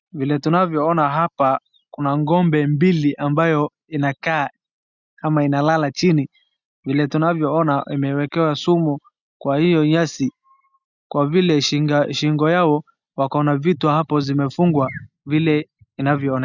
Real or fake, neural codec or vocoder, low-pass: real; none; 7.2 kHz